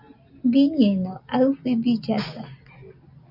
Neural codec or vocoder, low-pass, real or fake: none; 5.4 kHz; real